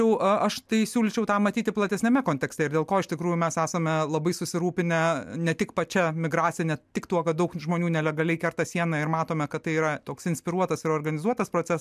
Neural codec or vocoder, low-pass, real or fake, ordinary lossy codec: none; 14.4 kHz; real; MP3, 96 kbps